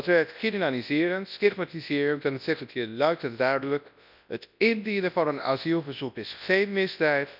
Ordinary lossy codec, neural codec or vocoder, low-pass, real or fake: none; codec, 24 kHz, 0.9 kbps, WavTokenizer, large speech release; 5.4 kHz; fake